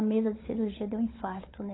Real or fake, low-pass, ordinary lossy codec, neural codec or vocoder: fake; 7.2 kHz; AAC, 16 kbps; codec, 16 kHz, 16 kbps, FunCodec, trained on LibriTTS, 50 frames a second